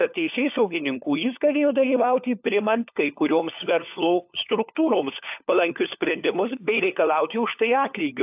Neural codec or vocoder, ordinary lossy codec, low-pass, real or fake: codec, 16 kHz, 4 kbps, FunCodec, trained on LibriTTS, 50 frames a second; AAC, 32 kbps; 3.6 kHz; fake